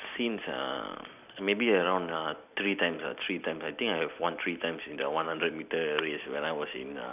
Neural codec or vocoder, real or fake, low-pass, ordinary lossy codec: vocoder, 44.1 kHz, 128 mel bands every 256 samples, BigVGAN v2; fake; 3.6 kHz; none